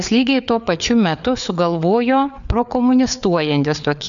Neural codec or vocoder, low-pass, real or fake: codec, 16 kHz, 4 kbps, FreqCodec, larger model; 7.2 kHz; fake